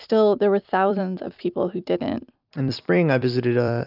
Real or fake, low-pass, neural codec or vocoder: fake; 5.4 kHz; vocoder, 44.1 kHz, 128 mel bands every 256 samples, BigVGAN v2